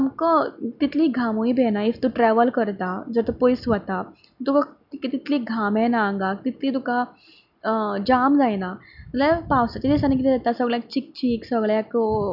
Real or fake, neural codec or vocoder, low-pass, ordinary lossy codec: real; none; 5.4 kHz; none